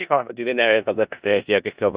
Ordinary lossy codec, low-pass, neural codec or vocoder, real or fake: Opus, 64 kbps; 3.6 kHz; codec, 16 kHz in and 24 kHz out, 0.4 kbps, LongCat-Audio-Codec, four codebook decoder; fake